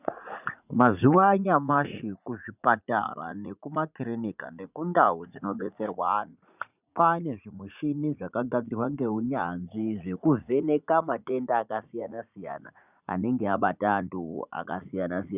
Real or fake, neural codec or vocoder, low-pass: fake; vocoder, 44.1 kHz, 80 mel bands, Vocos; 3.6 kHz